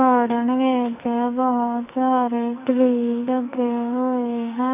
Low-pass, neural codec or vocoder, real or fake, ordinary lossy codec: 3.6 kHz; codec, 44.1 kHz, 2.6 kbps, SNAC; fake; none